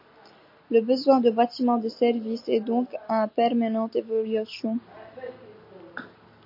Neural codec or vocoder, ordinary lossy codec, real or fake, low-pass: none; MP3, 32 kbps; real; 5.4 kHz